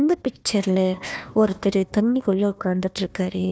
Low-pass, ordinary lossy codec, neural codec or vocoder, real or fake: none; none; codec, 16 kHz, 1 kbps, FunCodec, trained on Chinese and English, 50 frames a second; fake